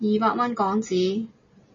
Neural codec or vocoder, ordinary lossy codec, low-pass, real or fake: none; MP3, 32 kbps; 7.2 kHz; real